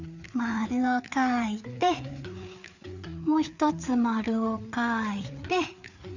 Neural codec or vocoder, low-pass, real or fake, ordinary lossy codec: codec, 16 kHz, 4 kbps, FreqCodec, larger model; 7.2 kHz; fake; none